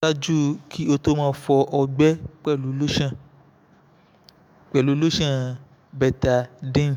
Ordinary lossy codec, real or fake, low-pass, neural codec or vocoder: MP3, 96 kbps; fake; 19.8 kHz; autoencoder, 48 kHz, 128 numbers a frame, DAC-VAE, trained on Japanese speech